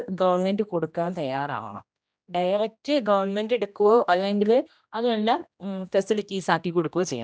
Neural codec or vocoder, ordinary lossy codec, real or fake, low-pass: codec, 16 kHz, 1 kbps, X-Codec, HuBERT features, trained on general audio; none; fake; none